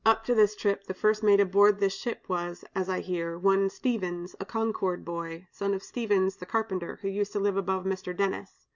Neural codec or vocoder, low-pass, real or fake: none; 7.2 kHz; real